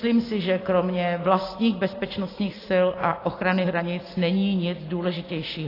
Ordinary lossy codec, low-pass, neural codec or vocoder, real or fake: AAC, 24 kbps; 5.4 kHz; none; real